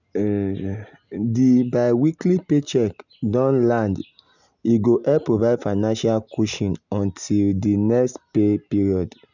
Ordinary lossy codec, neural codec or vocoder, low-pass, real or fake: none; none; 7.2 kHz; real